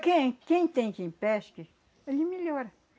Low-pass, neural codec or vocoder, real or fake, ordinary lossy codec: none; none; real; none